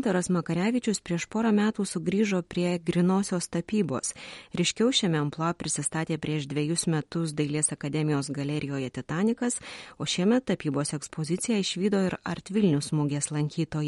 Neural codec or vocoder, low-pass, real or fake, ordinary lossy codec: none; 19.8 kHz; real; MP3, 48 kbps